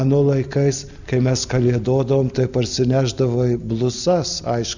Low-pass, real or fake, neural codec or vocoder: 7.2 kHz; real; none